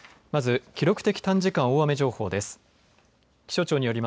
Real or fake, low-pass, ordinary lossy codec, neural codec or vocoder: real; none; none; none